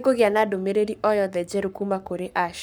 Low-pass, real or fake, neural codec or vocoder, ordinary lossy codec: none; fake; codec, 44.1 kHz, 7.8 kbps, Pupu-Codec; none